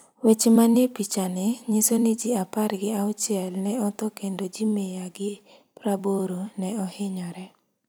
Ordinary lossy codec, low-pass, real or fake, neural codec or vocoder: none; none; fake; vocoder, 44.1 kHz, 128 mel bands every 256 samples, BigVGAN v2